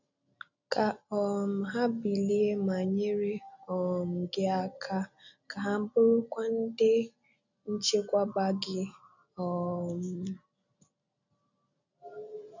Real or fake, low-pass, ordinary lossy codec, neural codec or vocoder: real; 7.2 kHz; none; none